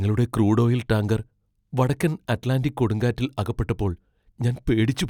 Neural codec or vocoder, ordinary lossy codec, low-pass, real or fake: none; none; 19.8 kHz; real